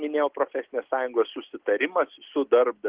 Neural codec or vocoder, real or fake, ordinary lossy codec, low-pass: none; real; Opus, 16 kbps; 3.6 kHz